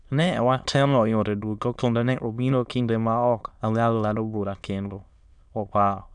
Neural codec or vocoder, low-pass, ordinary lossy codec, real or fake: autoencoder, 22.05 kHz, a latent of 192 numbers a frame, VITS, trained on many speakers; 9.9 kHz; none; fake